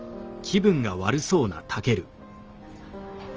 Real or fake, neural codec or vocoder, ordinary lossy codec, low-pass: real; none; Opus, 24 kbps; 7.2 kHz